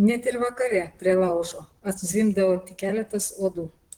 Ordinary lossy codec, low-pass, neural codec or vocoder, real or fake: Opus, 16 kbps; 19.8 kHz; vocoder, 44.1 kHz, 128 mel bands, Pupu-Vocoder; fake